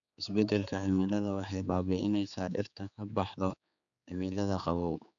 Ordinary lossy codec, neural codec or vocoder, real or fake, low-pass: none; codec, 16 kHz, 4 kbps, X-Codec, HuBERT features, trained on general audio; fake; 7.2 kHz